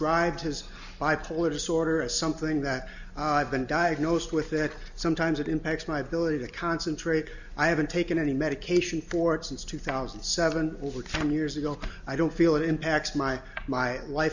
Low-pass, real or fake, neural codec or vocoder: 7.2 kHz; real; none